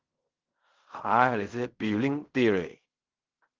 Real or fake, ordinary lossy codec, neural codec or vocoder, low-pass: fake; Opus, 32 kbps; codec, 16 kHz in and 24 kHz out, 0.4 kbps, LongCat-Audio-Codec, fine tuned four codebook decoder; 7.2 kHz